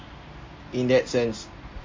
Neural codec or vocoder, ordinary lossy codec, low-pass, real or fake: none; MP3, 32 kbps; 7.2 kHz; real